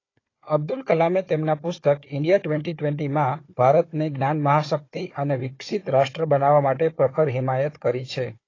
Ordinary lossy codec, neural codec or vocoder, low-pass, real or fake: AAC, 32 kbps; codec, 16 kHz, 4 kbps, FunCodec, trained on Chinese and English, 50 frames a second; 7.2 kHz; fake